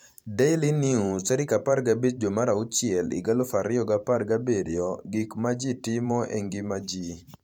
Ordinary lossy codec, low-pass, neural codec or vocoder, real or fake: MP3, 96 kbps; 19.8 kHz; vocoder, 44.1 kHz, 128 mel bands every 512 samples, BigVGAN v2; fake